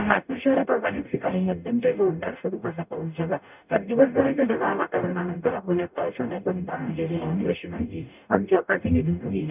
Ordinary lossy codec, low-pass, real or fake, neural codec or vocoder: none; 3.6 kHz; fake; codec, 44.1 kHz, 0.9 kbps, DAC